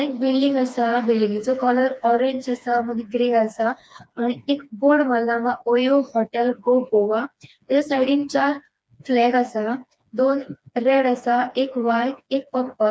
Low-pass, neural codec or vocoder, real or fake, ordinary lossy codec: none; codec, 16 kHz, 2 kbps, FreqCodec, smaller model; fake; none